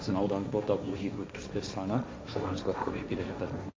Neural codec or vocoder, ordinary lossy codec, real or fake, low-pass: codec, 16 kHz, 1.1 kbps, Voila-Tokenizer; none; fake; none